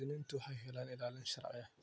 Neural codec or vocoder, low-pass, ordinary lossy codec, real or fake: none; none; none; real